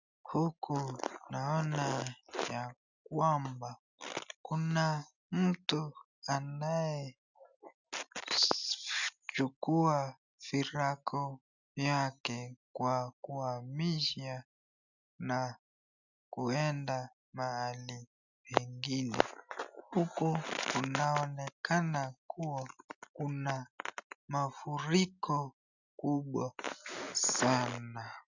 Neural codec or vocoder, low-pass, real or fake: none; 7.2 kHz; real